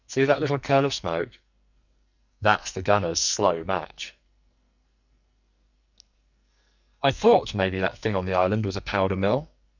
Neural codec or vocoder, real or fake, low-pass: codec, 44.1 kHz, 2.6 kbps, SNAC; fake; 7.2 kHz